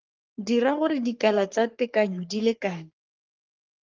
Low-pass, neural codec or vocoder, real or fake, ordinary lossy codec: 7.2 kHz; codec, 44.1 kHz, 3.4 kbps, Pupu-Codec; fake; Opus, 24 kbps